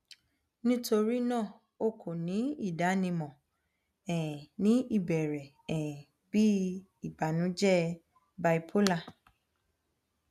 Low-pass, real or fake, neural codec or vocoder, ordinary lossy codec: 14.4 kHz; real; none; none